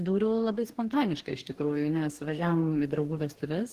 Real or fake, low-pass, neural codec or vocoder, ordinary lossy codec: fake; 14.4 kHz; codec, 44.1 kHz, 2.6 kbps, DAC; Opus, 16 kbps